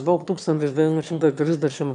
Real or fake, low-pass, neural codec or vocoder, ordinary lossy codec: fake; 9.9 kHz; autoencoder, 22.05 kHz, a latent of 192 numbers a frame, VITS, trained on one speaker; AAC, 96 kbps